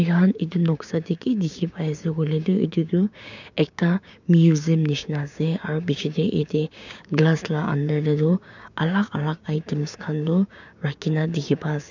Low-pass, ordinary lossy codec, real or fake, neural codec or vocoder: 7.2 kHz; none; fake; codec, 16 kHz, 6 kbps, DAC